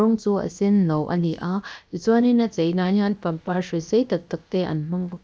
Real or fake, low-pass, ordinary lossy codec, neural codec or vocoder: fake; none; none; codec, 16 kHz, about 1 kbps, DyCAST, with the encoder's durations